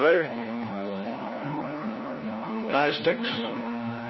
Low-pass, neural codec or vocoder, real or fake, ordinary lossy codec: 7.2 kHz; codec, 16 kHz, 1 kbps, FunCodec, trained on LibriTTS, 50 frames a second; fake; MP3, 24 kbps